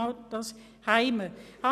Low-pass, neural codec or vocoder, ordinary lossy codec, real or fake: 14.4 kHz; none; none; real